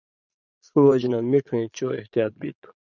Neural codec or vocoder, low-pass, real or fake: vocoder, 22.05 kHz, 80 mel bands, Vocos; 7.2 kHz; fake